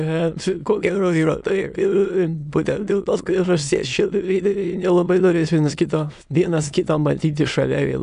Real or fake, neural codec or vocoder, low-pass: fake; autoencoder, 22.05 kHz, a latent of 192 numbers a frame, VITS, trained on many speakers; 9.9 kHz